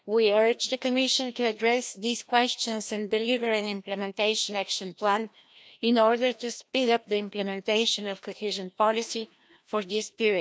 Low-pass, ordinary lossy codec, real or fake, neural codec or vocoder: none; none; fake; codec, 16 kHz, 1 kbps, FreqCodec, larger model